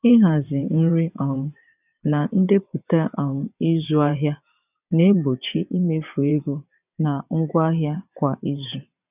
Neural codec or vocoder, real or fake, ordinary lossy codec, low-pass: vocoder, 22.05 kHz, 80 mel bands, WaveNeXt; fake; none; 3.6 kHz